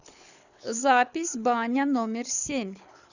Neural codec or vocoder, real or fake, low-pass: codec, 24 kHz, 6 kbps, HILCodec; fake; 7.2 kHz